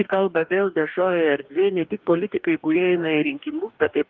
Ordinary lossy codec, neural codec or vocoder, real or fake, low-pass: Opus, 24 kbps; codec, 44.1 kHz, 2.6 kbps, DAC; fake; 7.2 kHz